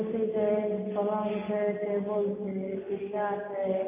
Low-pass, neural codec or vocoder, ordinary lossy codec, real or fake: 3.6 kHz; none; MP3, 16 kbps; real